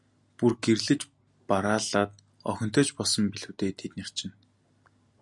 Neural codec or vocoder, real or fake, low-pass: none; real; 10.8 kHz